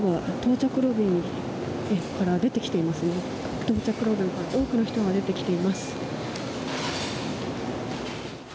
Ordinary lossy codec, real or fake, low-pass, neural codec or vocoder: none; real; none; none